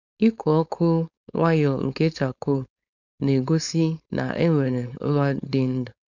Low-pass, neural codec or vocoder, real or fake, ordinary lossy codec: 7.2 kHz; codec, 16 kHz, 4.8 kbps, FACodec; fake; none